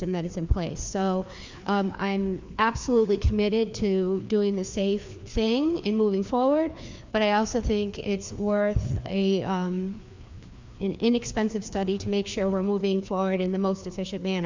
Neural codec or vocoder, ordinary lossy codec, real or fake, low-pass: codec, 16 kHz, 2 kbps, FreqCodec, larger model; MP3, 64 kbps; fake; 7.2 kHz